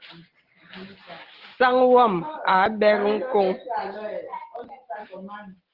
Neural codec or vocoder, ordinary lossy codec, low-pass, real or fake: none; Opus, 16 kbps; 5.4 kHz; real